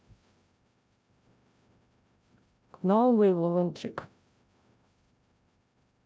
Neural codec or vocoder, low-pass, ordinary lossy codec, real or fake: codec, 16 kHz, 0.5 kbps, FreqCodec, larger model; none; none; fake